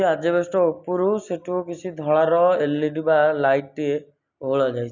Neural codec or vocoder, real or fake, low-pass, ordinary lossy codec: none; real; 7.2 kHz; none